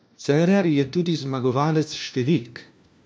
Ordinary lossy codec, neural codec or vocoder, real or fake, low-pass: none; codec, 16 kHz, 1 kbps, FunCodec, trained on LibriTTS, 50 frames a second; fake; none